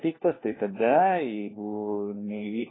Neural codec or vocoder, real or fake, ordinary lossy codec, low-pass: codec, 16 kHz, 1 kbps, FunCodec, trained on LibriTTS, 50 frames a second; fake; AAC, 16 kbps; 7.2 kHz